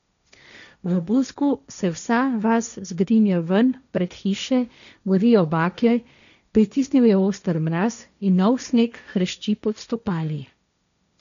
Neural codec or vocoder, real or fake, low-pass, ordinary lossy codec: codec, 16 kHz, 1.1 kbps, Voila-Tokenizer; fake; 7.2 kHz; none